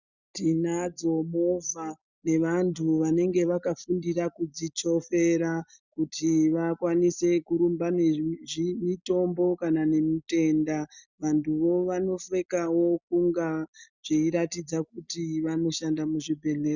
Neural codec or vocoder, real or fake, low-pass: none; real; 7.2 kHz